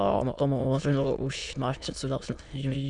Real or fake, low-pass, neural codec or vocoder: fake; 9.9 kHz; autoencoder, 22.05 kHz, a latent of 192 numbers a frame, VITS, trained on many speakers